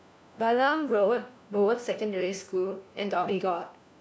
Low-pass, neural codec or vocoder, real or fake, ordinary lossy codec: none; codec, 16 kHz, 1 kbps, FunCodec, trained on LibriTTS, 50 frames a second; fake; none